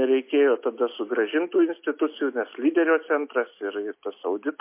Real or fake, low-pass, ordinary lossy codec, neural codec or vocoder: real; 3.6 kHz; MP3, 24 kbps; none